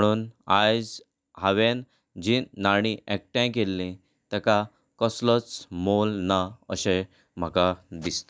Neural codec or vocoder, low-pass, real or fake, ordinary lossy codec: none; none; real; none